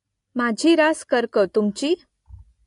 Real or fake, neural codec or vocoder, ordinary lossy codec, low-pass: real; none; AAC, 48 kbps; 10.8 kHz